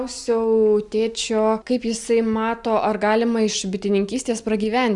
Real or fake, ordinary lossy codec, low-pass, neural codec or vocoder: real; Opus, 64 kbps; 10.8 kHz; none